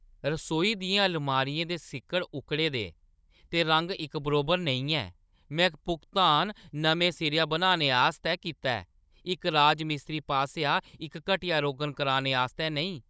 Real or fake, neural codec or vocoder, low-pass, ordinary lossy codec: fake; codec, 16 kHz, 16 kbps, FunCodec, trained on Chinese and English, 50 frames a second; none; none